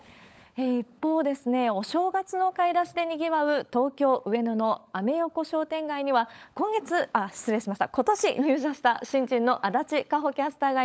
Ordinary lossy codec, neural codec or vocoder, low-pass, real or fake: none; codec, 16 kHz, 16 kbps, FunCodec, trained on LibriTTS, 50 frames a second; none; fake